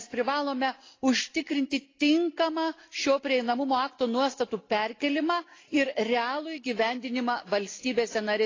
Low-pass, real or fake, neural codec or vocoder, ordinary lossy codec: 7.2 kHz; real; none; AAC, 32 kbps